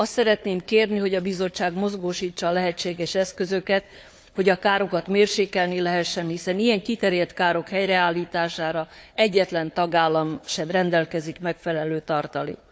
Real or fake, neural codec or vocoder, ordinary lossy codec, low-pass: fake; codec, 16 kHz, 4 kbps, FunCodec, trained on Chinese and English, 50 frames a second; none; none